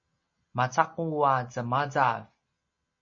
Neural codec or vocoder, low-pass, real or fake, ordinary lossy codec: none; 7.2 kHz; real; MP3, 32 kbps